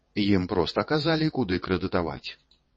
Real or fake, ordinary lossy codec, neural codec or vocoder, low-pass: fake; MP3, 32 kbps; vocoder, 22.05 kHz, 80 mel bands, WaveNeXt; 9.9 kHz